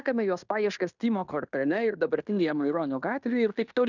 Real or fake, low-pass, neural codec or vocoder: fake; 7.2 kHz; codec, 16 kHz in and 24 kHz out, 0.9 kbps, LongCat-Audio-Codec, fine tuned four codebook decoder